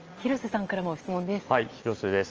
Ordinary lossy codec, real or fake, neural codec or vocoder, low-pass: Opus, 24 kbps; real; none; 7.2 kHz